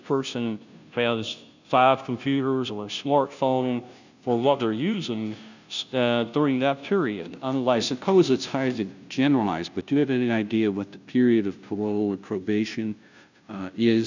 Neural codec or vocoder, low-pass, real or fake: codec, 16 kHz, 0.5 kbps, FunCodec, trained on Chinese and English, 25 frames a second; 7.2 kHz; fake